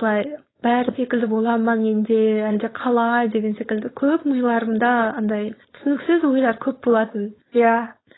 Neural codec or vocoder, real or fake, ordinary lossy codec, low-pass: codec, 16 kHz, 4.8 kbps, FACodec; fake; AAC, 16 kbps; 7.2 kHz